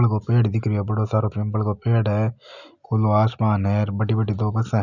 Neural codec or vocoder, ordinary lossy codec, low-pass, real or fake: none; none; 7.2 kHz; real